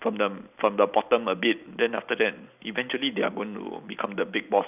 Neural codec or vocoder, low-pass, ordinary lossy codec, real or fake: none; 3.6 kHz; none; real